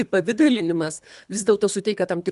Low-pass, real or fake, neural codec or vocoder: 10.8 kHz; fake; codec, 24 kHz, 3 kbps, HILCodec